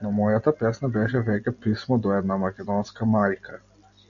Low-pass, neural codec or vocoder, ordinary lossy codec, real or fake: 7.2 kHz; none; MP3, 96 kbps; real